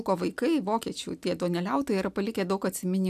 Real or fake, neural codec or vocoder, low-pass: real; none; 14.4 kHz